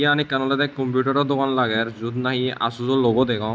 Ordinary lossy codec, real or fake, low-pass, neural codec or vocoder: none; real; none; none